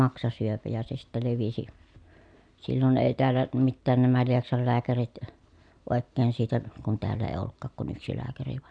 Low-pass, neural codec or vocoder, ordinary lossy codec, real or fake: 9.9 kHz; none; none; real